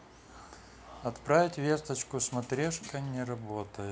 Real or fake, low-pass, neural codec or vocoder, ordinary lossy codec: real; none; none; none